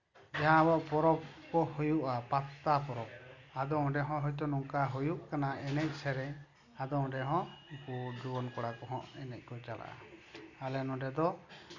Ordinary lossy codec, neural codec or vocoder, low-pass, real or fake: none; none; 7.2 kHz; real